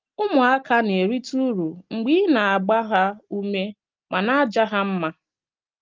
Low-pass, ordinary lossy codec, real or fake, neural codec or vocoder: 7.2 kHz; Opus, 24 kbps; real; none